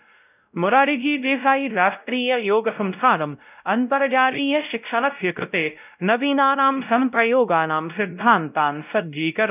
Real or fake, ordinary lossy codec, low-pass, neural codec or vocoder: fake; none; 3.6 kHz; codec, 16 kHz, 0.5 kbps, X-Codec, WavLM features, trained on Multilingual LibriSpeech